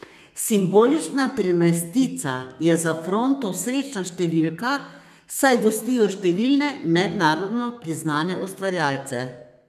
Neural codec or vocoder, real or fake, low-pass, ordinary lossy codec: codec, 32 kHz, 1.9 kbps, SNAC; fake; 14.4 kHz; none